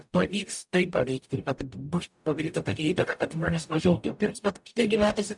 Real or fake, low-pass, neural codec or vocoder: fake; 10.8 kHz; codec, 44.1 kHz, 0.9 kbps, DAC